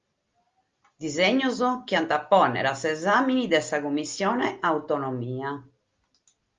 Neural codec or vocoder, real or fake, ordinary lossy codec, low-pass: none; real; Opus, 32 kbps; 7.2 kHz